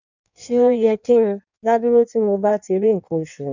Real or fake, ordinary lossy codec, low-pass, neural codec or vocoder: fake; none; 7.2 kHz; codec, 16 kHz in and 24 kHz out, 1.1 kbps, FireRedTTS-2 codec